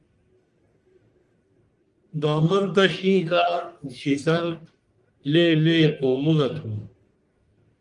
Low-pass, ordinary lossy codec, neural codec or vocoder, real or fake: 10.8 kHz; Opus, 32 kbps; codec, 44.1 kHz, 1.7 kbps, Pupu-Codec; fake